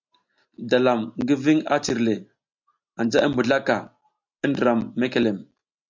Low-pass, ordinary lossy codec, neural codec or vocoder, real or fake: 7.2 kHz; MP3, 64 kbps; none; real